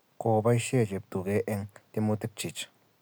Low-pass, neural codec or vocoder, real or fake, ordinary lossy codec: none; none; real; none